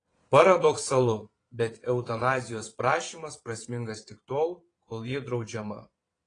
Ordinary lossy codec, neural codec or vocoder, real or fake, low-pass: AAC, 32 kbps; vocoder, 22.05 kHz, 80 mel bands, Vocos; fake; 9.9 kHz